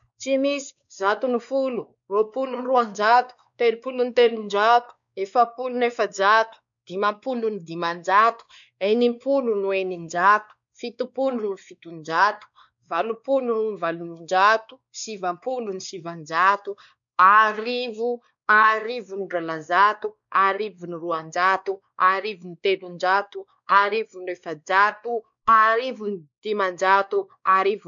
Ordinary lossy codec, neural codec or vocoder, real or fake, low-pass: none; codec, 16 kHz, 2 kbps, X-Codec, WavLM features, trained on Multilingual LibriSpeech; fake; 7.2 kHz